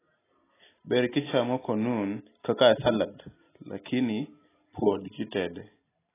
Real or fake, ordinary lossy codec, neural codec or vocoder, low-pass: real; AAC, 16 kbps; none; 3.6 kHz